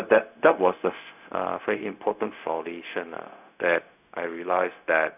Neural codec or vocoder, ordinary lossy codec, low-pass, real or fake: codec, 16 kHz, 0.4 kbps, LongCat-Audio-Codec; none; 3.6 kHz; fake